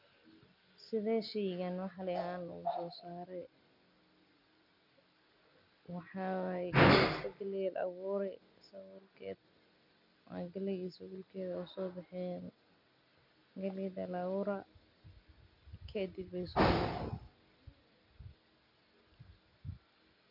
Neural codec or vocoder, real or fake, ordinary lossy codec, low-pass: none; real; none; 5.4 kHz